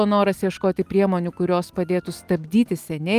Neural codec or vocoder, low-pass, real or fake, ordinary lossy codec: none; 14.4 kHz; real; Opus, 32 kbps